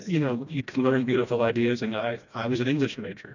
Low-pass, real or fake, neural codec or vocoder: 7.2 kHz; fake; codec, 16 kHz, 1 kbps, FreqCodec, smaller model